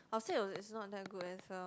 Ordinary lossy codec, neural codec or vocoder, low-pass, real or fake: none; none; none; real